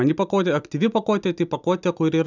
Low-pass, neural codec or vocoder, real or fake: 7.2 kHz; none; real